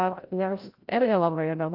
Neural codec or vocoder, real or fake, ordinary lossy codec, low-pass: codec, 16 kHz, 0.5 kbps, FreqCodec, larger model; fake; Opus, 24 kbps; 5.4 kHz